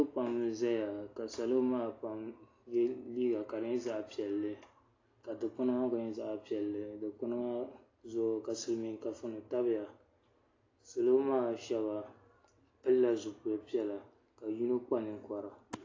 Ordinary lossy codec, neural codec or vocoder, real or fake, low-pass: AAC, 32 kbps; none; real; 7.2 kHz